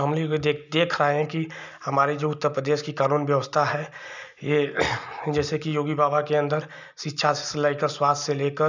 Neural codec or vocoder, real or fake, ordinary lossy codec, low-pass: none; real; none; 7.2 kHz